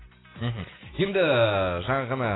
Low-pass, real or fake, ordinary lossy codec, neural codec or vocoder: 7.2 kHz; real; AAC, 16 kbps; none